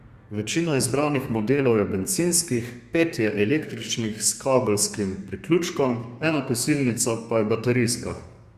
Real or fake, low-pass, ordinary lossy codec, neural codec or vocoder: fake; 14.4 kHz; Opus, 64 kbps; codec, 32 kHz, 1.9 kbps, SNAC